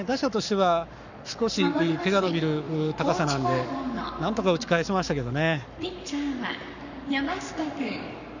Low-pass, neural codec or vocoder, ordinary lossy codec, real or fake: 7.2 kHz; codec, 44.1 kHz, 7.8 kbps, Pupu-Codec; none; fake